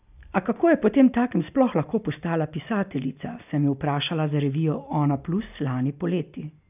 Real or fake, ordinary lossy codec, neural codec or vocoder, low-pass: real; none; none; 3.6 kHz